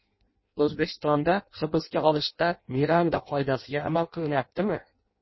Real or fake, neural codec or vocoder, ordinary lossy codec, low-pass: fake; codec, 16 kHz in and 24 kHz out, 0.6 kbps, FireRedTTS-2 codec; MP3, 24 kbps; 7.2 kHz